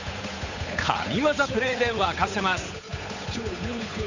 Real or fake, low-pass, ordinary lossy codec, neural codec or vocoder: fake; 7.2 kHz; none; codec, 16 kHz, 8 kbps, FunCodec, trained on Chinese and English, 25 frames a second